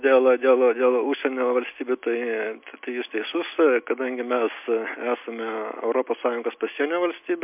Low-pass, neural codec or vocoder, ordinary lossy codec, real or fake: 3.6 kHz; none; MP3, 32 kbps; real